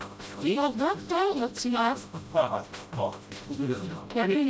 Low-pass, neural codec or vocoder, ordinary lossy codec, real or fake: none; codec, 16 kHz, 0.5 kbps, FreqCodec, smaller model; none; fake